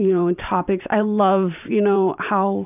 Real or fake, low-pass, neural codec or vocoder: fake; 3.6 kHz; vocoder, 44.1 kHz, 128 mel bands every 512 samples, BigVGAN v2